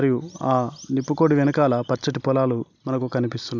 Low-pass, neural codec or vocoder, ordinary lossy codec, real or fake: 7.2 kHz; none; none; real